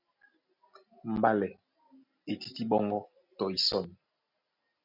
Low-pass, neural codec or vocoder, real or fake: 5.4 kHz; none; real